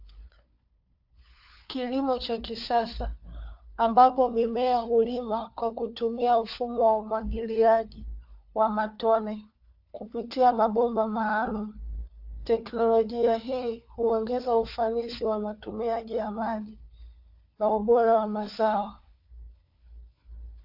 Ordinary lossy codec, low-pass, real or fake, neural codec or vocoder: AAC, 48 kbps; 5.4 kHz; fake; codec, 16 kHz, 4 kbps, FunCodec, trained on LibriTTS, 50 frames a second